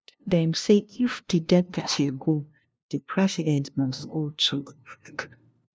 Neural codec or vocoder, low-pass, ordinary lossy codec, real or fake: codec, 16 kHz, 0.5 kbps, FunCodec, trained on LibriTTS, 25 frames a second; none; none; fake